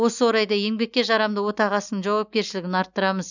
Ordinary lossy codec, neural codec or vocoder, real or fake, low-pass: none; none; real; 7.2 kHz